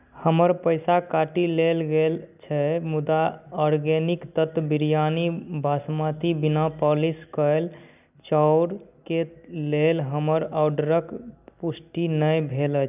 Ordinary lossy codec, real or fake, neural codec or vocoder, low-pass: none; real; none; 3.6 kHz